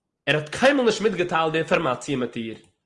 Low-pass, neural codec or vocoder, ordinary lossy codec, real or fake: 10.8 kHz; none; Opus, 24 kbps; real